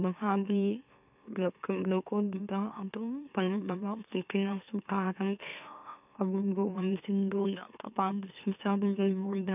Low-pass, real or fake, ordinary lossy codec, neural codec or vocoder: 3.6 kHz; fake; none; autoencoder, 44.1 kHz, a latent of 192 numbers a frame, MeloTTS